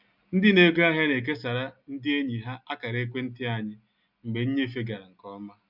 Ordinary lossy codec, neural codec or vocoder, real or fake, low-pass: none; none; real; 5.4 kHz